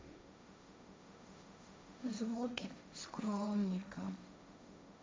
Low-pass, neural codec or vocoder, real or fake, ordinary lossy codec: none; codec, 16 kHz, 1.1 kbps, Voila-Tokenizer; fake; none